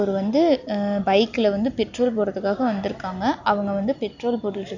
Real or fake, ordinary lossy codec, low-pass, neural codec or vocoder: real; none; 7.2 kHz; none